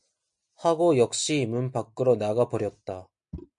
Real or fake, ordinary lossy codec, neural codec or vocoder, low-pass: real; MP3, 64 kbps; none; 9.9 kHz